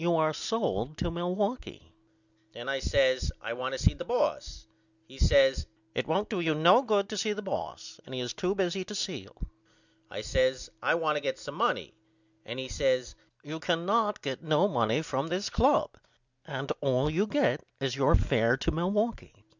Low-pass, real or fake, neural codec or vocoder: 7.2 kHz; real; none